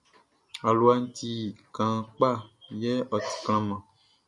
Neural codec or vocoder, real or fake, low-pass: none; real; 10.8 kHz